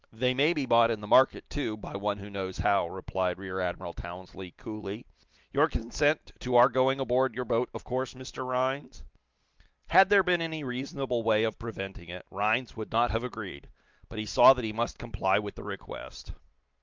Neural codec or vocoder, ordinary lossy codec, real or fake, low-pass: none; Opus, 24 kbps; real; 7.2 kHz